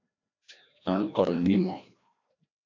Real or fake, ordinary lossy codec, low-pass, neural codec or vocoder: fake; AAC, 48 kbps; 7.2 kHz; codec, 16 kHz, 1 kbps, FreqCodec, larger model